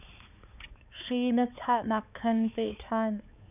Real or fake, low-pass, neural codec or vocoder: fake; 3.6 kHz; codec, 16 kHz, 4 kbps, X-Codec, HuBERT features, trained on balanced general audio